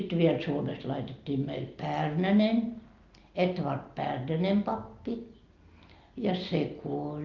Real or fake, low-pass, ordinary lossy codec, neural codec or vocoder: real; 7.2 kHz; Opus, 32 kbps; none